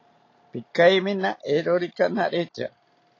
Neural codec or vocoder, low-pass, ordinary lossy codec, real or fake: none; 7.2 kHz; AAC, 32 kbps; real